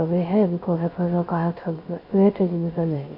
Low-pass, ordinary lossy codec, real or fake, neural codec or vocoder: 5.4 kHz; none; fake; codec, 16 kHz, 0.3 kbps, FocalCodec